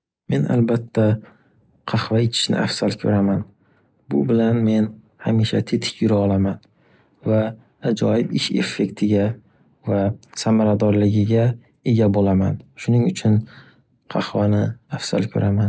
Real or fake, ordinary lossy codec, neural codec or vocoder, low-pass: real; none; none; none